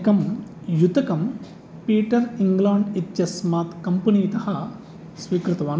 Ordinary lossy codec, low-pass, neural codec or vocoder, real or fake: none; none; none; real